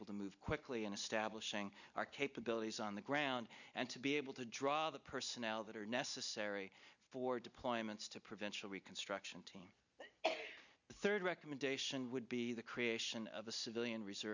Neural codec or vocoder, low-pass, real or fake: none; 7.2 kHz; real